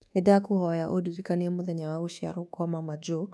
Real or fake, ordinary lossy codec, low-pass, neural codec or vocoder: fake; none; none; codec, 24 kHz, 1.2 kbps, DualCodec